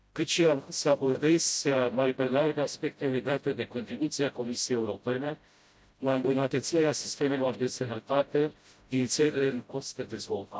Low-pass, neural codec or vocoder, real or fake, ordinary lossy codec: none; codec, 16 kHz, 0.5 kbps, FreqCodec, smaller model; fake; none